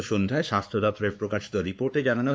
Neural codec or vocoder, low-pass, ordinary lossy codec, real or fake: codec, 16 kHz, 2 kbps, X-Codec, WavLM features, trained on Multilingual LibriSpeech; none; none; fake